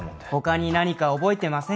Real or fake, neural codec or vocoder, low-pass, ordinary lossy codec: real; none; none; none